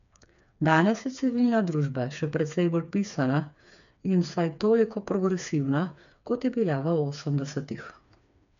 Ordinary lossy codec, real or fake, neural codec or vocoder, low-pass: none; fake; codec, 16 kHz, 4 kbps, FreqCodec, smaller model; 7.2 kHz